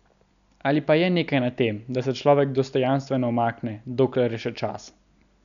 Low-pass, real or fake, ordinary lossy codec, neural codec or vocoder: 7.2 kHz; real; none; none